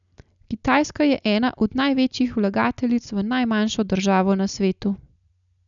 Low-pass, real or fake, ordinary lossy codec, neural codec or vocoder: 7.2 kHz; real; none; none